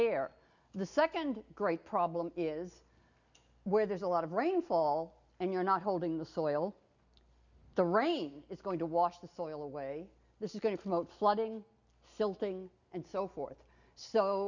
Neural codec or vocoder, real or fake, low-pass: none; real; 7.2 kHz